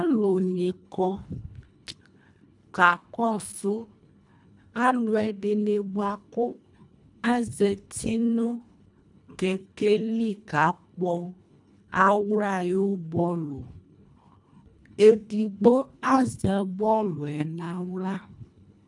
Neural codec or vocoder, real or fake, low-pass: codec, 24 kHz, 1.5 kbps, HILCodec; fake; 10.8 kHz